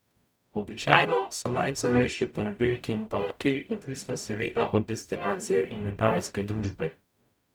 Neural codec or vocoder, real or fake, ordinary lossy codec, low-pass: codec, 44.1 kHz, 0.9 kbps, DAC; fake; none; none